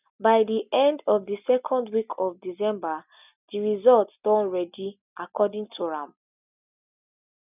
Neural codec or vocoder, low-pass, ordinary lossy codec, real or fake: none; 3.6 kHz; none; real